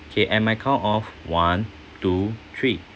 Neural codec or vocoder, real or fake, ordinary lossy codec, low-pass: none; real; none; none